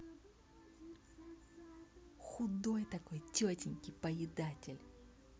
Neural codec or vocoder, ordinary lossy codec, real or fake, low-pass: none; none; real; none